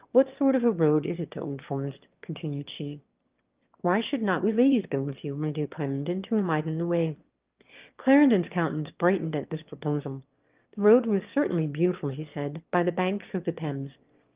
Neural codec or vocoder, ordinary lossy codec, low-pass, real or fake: autoencoder, 22.05 kHz, a latent of 192 numbers a frame, VITS, trained on one speaker; Opus, 32 kbps; 3.6 kHz; fake